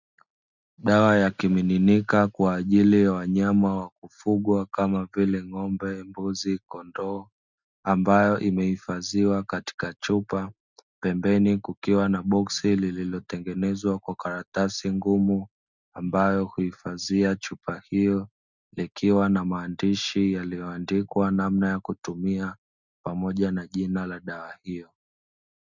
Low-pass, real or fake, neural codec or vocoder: 7.2 kHz; real; none